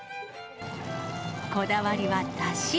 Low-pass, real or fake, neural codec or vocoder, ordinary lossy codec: none; real; none; none